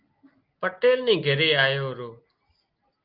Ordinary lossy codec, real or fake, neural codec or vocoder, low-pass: Opus, 32 kbps; real; none; 5.4 kHz